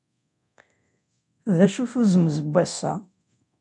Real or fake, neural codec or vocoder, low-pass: fake; codec, 24 kHz, 0.9 kbps, DualCodec; 10.8 kHz